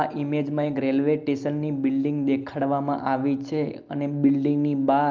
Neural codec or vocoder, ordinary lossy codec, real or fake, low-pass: none; Opus, 32 kbps; real; 7.2 kHz